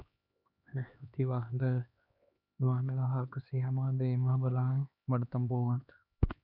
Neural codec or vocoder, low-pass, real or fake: codec, 16 kHz, 2 kbps, X-Codec, HuBERT features, trained on LibriSpeech; 5.4 kHz; fake